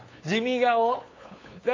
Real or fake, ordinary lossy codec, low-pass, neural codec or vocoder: fake; AAC, 32 kbps; 7.2 kHz; codec, 16 kHz, 8 kbps, FunCodec, trained on LibriTTS, 25 frames a second